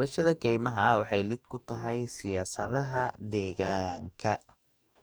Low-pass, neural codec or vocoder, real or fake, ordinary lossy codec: none; codec, 44.1 kHz, 2.6 kbps, DAC; fake; none